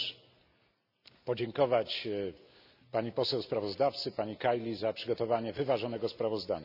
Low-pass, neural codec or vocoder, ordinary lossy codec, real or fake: 5.4 kHz; none; none; real